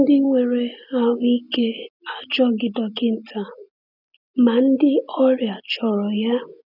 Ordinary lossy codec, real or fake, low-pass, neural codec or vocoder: none; real; 5.4 kHz; none